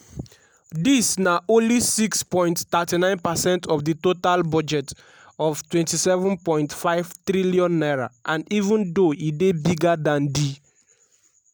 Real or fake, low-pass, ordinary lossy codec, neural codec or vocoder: real; none; none; none